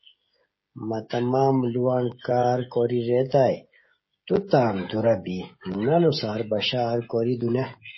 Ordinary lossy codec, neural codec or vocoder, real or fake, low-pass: MP3, 24 kbps; codec, 16 kHz, 16 kbps, FreqCodec, smaller model; fake; 7.2 kHz